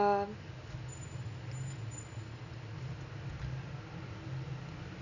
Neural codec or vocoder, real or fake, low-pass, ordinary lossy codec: none; real; 7.2 kHz; none